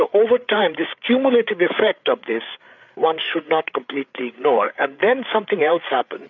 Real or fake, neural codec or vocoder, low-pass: fake; codec, 16 kHz, 8 kbps, FreqCodec, larger model; 7.2 kHz